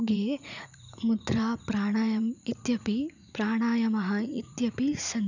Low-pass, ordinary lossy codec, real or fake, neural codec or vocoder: 7.2 kHz; none; fake; vocoder, 44.1 kHz, 80 mel bands, Vocos